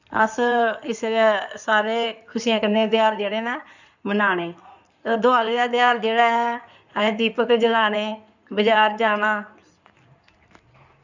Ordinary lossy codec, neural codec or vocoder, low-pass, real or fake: none; codec, 16 kHz in and 24 kHz out, 2.2 kbps, FireRedTTS-2 codec; 7.2 kHz; fake